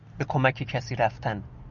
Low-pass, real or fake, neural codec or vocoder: 7.2 kHz; real; none